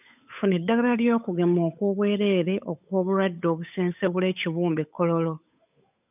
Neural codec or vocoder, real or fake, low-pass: codec, 16 kHz, 8 kbps, FunCodec, trained on Chinese and English, 25 frames a second; fake; 3.6 kHz